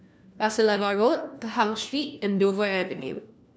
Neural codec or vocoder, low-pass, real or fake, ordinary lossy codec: codec, 16 kHz, 1 kbps, FunCodec, trained on LibriTTS, 50 frames a second; none; fake; none